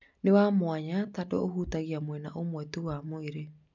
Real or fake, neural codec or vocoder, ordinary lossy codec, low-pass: real; none; none; 7.2 kHz